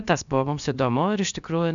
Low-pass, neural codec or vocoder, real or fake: 7.2 kHz; codec, 16 kHz, about 1 kbps, DyCAST, with the encoder's durations; fake